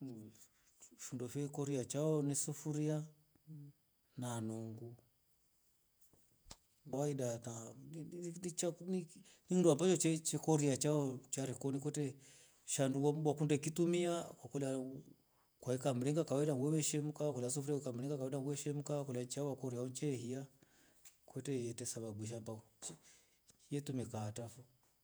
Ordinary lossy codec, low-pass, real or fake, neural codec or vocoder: none; none; fake; vocoder, 48 kHz, 128 mel bands, Vocos